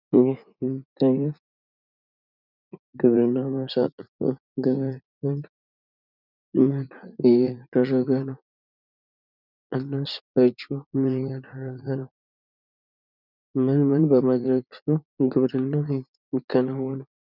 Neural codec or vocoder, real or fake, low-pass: vocoder, 44.1 kHz, 80 mel bands, Vocos; fake; 5.4 kHz